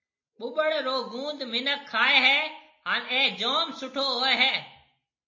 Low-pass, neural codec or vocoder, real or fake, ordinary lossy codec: 7.2 kHz; none; real; MP3, 32 kbps